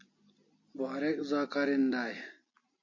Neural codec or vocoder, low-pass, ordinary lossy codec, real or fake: none; 7.2 kHz; MP3, 32 kbps; real